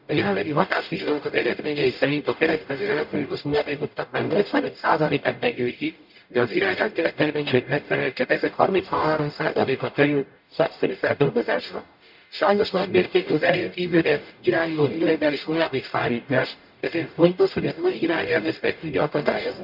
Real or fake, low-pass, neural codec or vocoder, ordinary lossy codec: fake; 5.4 kHz; codec, 44.1 kHz, 0.9 kbps, DAC; MP3, 48 kbps